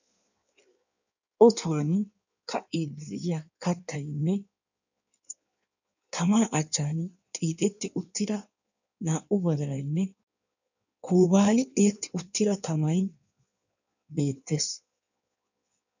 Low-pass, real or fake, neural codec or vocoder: 7.2 kHz; fake; codec, 16 kHz in and 24 kHz out, 1.1 kbps, FireRedTTS-2 codec